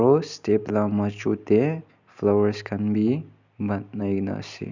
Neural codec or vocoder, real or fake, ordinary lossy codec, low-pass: none; real; none; 7.2 kHz